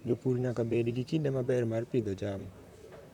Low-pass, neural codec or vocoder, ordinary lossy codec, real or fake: 19.8 kHz; vocoder, 44.1 kHz, 128 mel bands, Pupu-Vocoder; none; fake